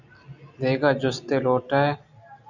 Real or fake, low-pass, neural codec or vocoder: real; 7.2 kHz; none